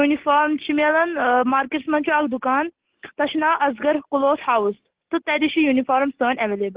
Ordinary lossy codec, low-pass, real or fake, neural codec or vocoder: Opus, 16 kbps; 3.6 kHz; real; none